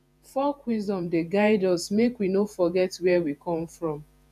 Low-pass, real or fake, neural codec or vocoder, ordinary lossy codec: 14.4 kHz; fake; vocoder, 48 kHz, 128 mel bands, Vocos; none